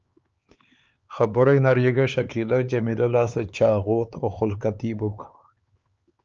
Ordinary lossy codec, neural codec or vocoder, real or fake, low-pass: Opus, 24 kbps; codec, 16 kHz, 4 kbps, X-Codec, HuBERT features, trained on LibriSpeech; fake; 7.2 kHz